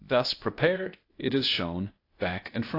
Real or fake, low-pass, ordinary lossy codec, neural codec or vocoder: fake; 5.4 kHz; AAC, 32 kbps; codec, 16 kHz, 0.8 kbps, ZipCodec